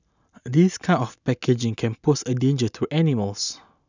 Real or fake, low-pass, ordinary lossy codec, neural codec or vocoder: real; 7.2 kHz; none; none